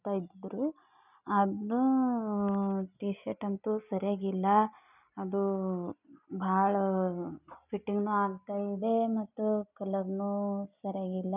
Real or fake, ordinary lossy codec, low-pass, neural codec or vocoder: real; none; 3.6 kHz; none